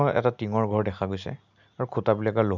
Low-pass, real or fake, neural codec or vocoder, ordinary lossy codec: 7.2 kHz; real; none; none